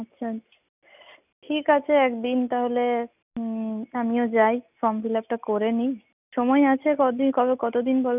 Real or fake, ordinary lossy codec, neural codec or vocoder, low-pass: real; none; none; 3.6 kHz